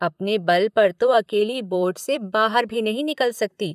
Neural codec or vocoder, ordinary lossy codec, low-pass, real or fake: vocoder, 44.1 kHz, 128 mel bands, Pupu-Vocoder; none; 14.4 kHz; fake